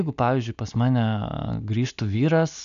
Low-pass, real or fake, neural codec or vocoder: 7.2 kHz; real; none